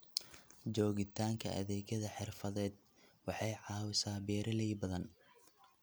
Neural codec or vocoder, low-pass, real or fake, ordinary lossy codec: none; none; real; none